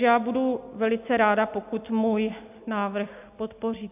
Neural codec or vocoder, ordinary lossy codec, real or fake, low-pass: none; AAC, 32 kbps; real; 3.6 kHz